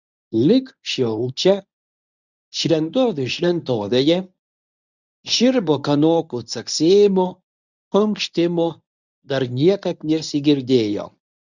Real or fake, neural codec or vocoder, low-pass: fake; codec, 24 kHz, 0.9 kbps, WavTokenizer, medium speech release version 1; 7.2 kHz